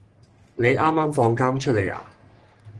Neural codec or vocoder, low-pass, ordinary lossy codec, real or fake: codec, 44.1 kHz, 3.4 kbps, Pupu-Codec; 10.8 kHz; Opus, 24 kbps; fake